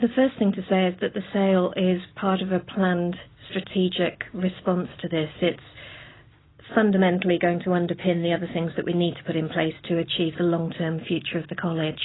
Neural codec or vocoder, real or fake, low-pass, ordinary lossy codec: none; real; 7.2 kHz; AAC, 16 kbps